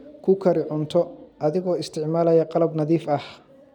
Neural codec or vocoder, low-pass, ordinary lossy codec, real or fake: none; 19.8 kHz; none; real